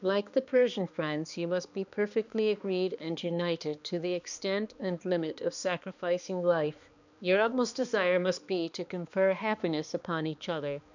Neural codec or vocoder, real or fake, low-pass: codec, 16 kHz, 2 kbps, X-Codec, HuBERT features, trained on balanced general audio; fake; 7.2 kHz